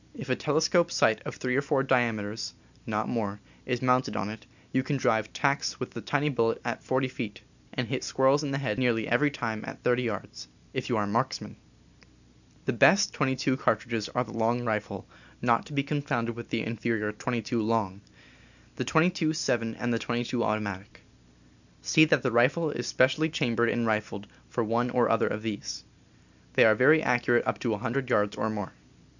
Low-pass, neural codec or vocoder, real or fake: 7.2 kHz; none; real